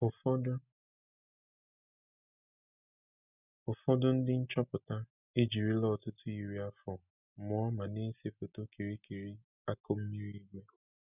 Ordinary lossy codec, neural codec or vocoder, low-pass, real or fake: none; none; 3.6 kHz; real